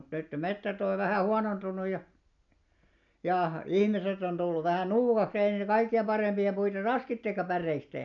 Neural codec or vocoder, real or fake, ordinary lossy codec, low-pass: none; real; none; 7.2 kHz